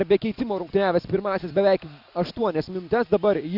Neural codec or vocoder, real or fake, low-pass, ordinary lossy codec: none; real; 5.4 kHz; Opus, 64 kbps